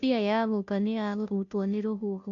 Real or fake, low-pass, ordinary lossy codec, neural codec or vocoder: fake; 7.2 kHz; none; codec, 16 kHz, 0.5 kbps, FunCodec, trained on Chinese and English, 25 frames a second